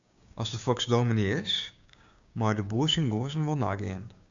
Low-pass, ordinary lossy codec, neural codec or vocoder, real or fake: 7.2 kHz; AAC, 64 kbps; codec, 16 kHz, 6 kbps, DAC; fake